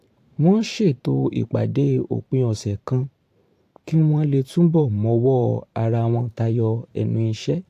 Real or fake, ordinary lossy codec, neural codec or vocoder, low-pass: fake; AAC, 64 kbps; vocoder, 44.1 kHz, 128 mel bands every 512 samples, BigVGAN v2; 14.4 kHz